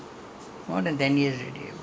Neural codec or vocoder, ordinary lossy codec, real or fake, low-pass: none; none; real; none